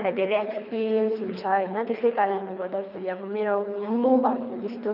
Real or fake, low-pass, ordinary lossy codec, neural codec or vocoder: fake; 5.4 kHz; none; codec, 24 kHz, 3 kbps, HILCodec